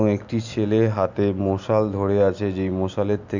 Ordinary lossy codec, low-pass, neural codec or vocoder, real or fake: none; 7.2 kHz; none; real